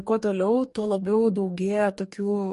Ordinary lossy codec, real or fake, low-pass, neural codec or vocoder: MP3, 48 kbps; fake; 14.4 kHz; codec, 44.1 kHz, 2.6 kbps, DAC